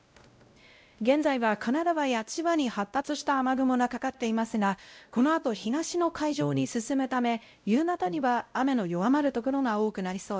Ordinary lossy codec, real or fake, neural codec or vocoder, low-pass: none; fake; codec, 16 kHz, 0.5 kbps, X-Codec, WavLM features, trained on Multilingual LibriSpeech; none